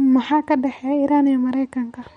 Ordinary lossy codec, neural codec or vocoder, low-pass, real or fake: MP3, 48 kbps; none; 19.8 kHz; real